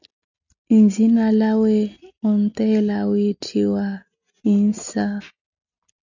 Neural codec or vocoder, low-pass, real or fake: none; 7.2 kHz; real